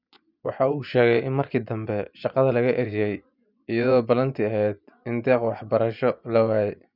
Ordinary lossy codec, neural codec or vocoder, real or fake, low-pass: none; vocoder, 24 kHz, 100 mel bands, Vocos; fake; 5.4 kHz